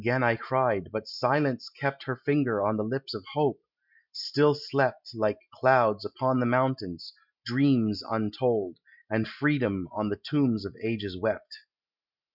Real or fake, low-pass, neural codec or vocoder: real; 5.4 kHz; none